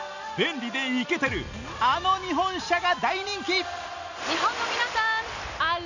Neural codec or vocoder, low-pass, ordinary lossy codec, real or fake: none; 7.2 kHz; none; real